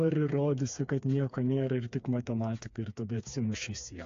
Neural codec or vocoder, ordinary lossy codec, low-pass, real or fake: codec, 16 kHz, 4 kbps, FreqCodec, smaller model; AAC, 48 kbps; 7.2 kHz; fake